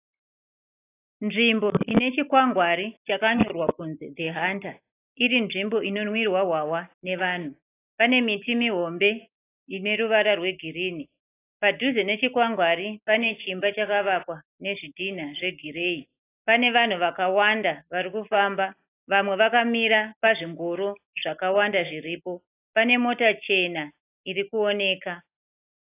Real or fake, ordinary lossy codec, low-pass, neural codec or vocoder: real; AAC, 24 kbps; 3.6 kHz; none